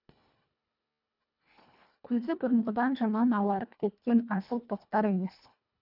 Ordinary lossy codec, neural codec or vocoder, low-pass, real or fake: none; codec, 24 kHz, 1.5 kbps, HILCodec; 5.4 kHz; fake